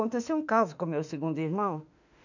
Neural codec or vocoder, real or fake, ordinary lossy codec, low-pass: autoencoder, 48 kHz, 32 numbers a frame, DAC-VAE, trained on Japanese speech; fake; none; 7.2 kHz